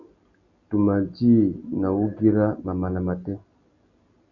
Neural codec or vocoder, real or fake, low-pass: none; real; 7.2 kHz